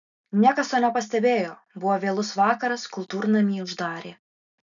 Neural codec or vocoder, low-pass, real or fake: none; 7.2 kHz; real